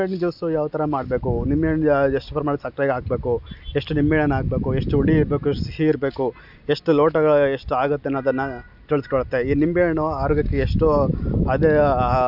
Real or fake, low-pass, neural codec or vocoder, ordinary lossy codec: real; 5.4 kHz; none; none